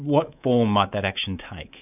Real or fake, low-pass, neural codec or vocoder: fake; 3.6 kHz; codec, 24 kHz, 3.1 kbps, DualCodec